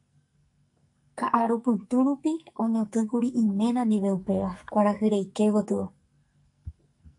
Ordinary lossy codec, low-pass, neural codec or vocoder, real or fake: AAC, 64 kbps; 10.8 kHz; codec, 44.1 kHz, 2.6 kbps, SNAC; fake